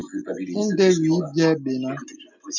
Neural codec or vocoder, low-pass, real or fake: none; 7.2 kHz; real